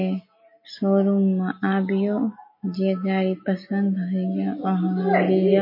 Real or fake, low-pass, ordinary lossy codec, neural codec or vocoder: real; 5.4 kHz; MP3, 24 kbps; none